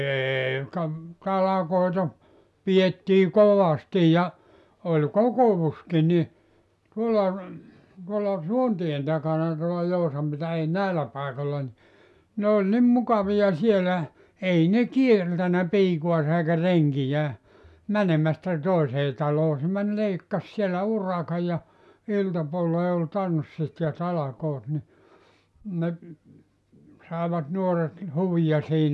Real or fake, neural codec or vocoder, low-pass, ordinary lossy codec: real; none; none; none